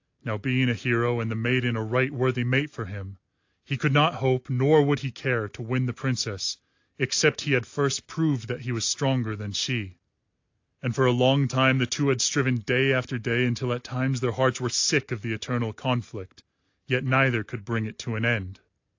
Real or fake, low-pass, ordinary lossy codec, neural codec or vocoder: real; 7.2 kHz; AAC, 48 kbps; none